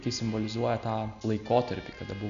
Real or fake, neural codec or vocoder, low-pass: real; none; 7.2 kHz